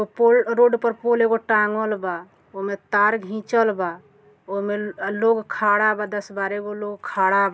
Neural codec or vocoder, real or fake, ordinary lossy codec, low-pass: none; real; none; none